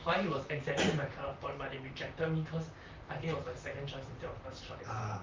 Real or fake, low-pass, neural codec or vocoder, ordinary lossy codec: fake; 7.2 kHz; vocoder, 44.1 kHz, 128 mel bands, Pupu-Vocoder; Opus, 24 kbps